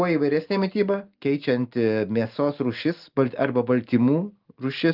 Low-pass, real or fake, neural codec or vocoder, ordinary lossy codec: 5.4 kHz; real; none; Opus, 32 kbps